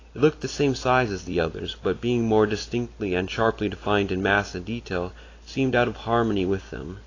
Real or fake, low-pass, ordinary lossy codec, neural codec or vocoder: real; 7.2 kHz; AAC, 32 kbps; none